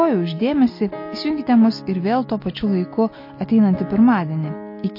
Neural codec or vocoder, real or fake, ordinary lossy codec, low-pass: none; real; MP3, 32 kbps; 5.4 kHz